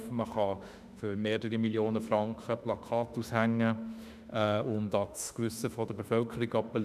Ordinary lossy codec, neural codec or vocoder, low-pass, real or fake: none; autoencoder, 48 kHz, 32 numbers a frame, DAC-VAE, trained on Japanese speech; 14.4 kHz; fake